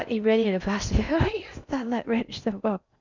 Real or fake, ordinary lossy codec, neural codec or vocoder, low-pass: fake; none; codec, 16 kHz in and 24 kHz out, 0.6 kbps, FocalCodec, streaming, 4096 codes; 7.2 kHz